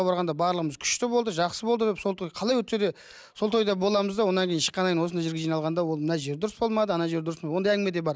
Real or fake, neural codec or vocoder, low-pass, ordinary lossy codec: real; none; none; none